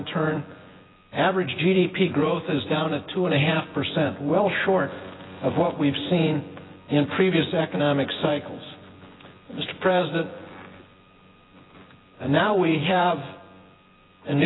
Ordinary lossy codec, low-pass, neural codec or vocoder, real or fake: AAC, 16 kbps; 7.2 kHz; vocoder, 24 kHz, 100 mel bands, Vocos; fake